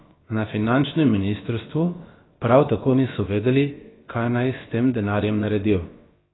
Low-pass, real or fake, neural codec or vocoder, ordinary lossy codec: 7.2 kHz; fake; codec, 16 kHz, about 1 kbps, DyCAST, with the encoder's durations; AAC, 16 kbps